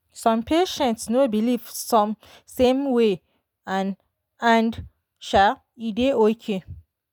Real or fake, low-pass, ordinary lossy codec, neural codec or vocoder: real; none; none; none